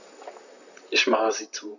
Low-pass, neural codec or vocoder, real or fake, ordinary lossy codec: 7.2 kHz; vocoder, 44.1 kHz, 128 mel bands, Pupu-Vocoder; fake; none